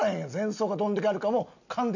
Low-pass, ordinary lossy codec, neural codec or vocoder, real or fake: 7.2 kHz; none; none; real